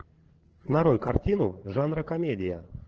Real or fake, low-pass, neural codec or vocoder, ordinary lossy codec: fake; 7.2 kHz; codec, 16 kHz, 8 kbps, FreqCodec, larger model; Opus, 16 kbps